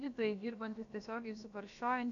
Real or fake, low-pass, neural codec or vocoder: fake; 7.2 kHz; codec, 16 kHz, about 1 kbps, DyCAST, with the encoder's durations